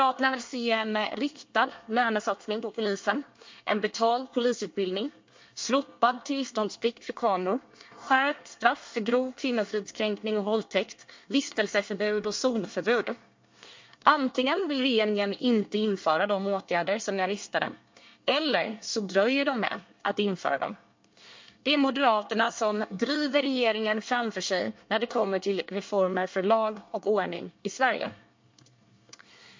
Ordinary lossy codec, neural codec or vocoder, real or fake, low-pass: MP3, 48 kbps; codec, 24 kHz, 1 kbps, SNAC; fake; 7.2 kHz